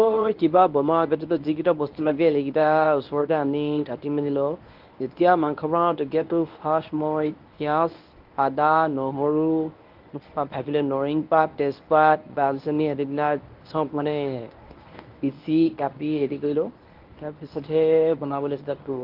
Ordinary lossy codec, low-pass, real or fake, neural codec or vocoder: Opus, 32 kbps; 5.4 kHz; fake; codec, 24 kHz, 0.9 kbps, WavTokenizer, medium speech release version 1